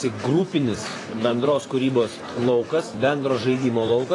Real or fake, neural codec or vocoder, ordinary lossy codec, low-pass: fake; vocoder, 44.1 kHz, 128 mel bands, Pupu-Vocoder; AAC, 32 kbps; 10.8 kHz